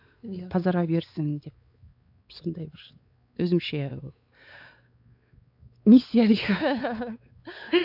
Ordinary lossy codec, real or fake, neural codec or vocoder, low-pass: AAC, 48 kbps; fake; codec, 16 kHz, 4 kbps, X-Codec, WavLM features, trained on Multilingual LibriSpeech; 5.4 kHz